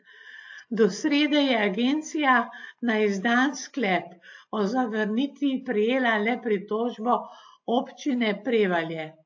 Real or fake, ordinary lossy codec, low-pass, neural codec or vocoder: real; AAC, 48 kbps; 7.2 kHz; none